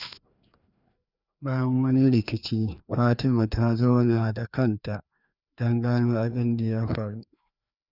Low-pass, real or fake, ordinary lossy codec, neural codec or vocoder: 5.4 kHz; fake; none; codec, 16 kHz, 2 kbps, FreqCodec, larger model